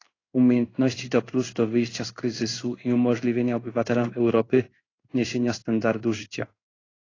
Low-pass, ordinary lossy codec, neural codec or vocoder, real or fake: 7.2 kHz; AAC, 32 kbps; codec, 16 kHz in and 24 kHz out, 1 kbps, XY-Tokenizer; fake